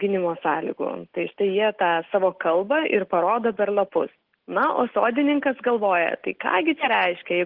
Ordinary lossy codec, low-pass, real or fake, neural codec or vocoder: Opus, 16 kbps; 5.4 kHz; real; none